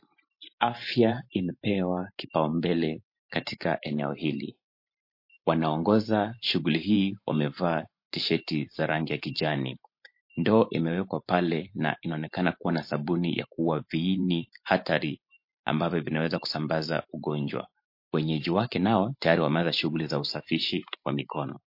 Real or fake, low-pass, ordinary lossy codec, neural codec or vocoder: fake; 5.4 kHz; MP3, 32 kbps; vocoder, 44.1 kHz, 128 mel bands every 512 samples, BigVGAN v2